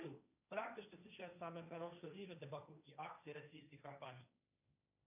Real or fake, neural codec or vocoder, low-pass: fake; codec, 16 kHz, 1.1 kbps, Voila-Tokenizer; 3.6 kHz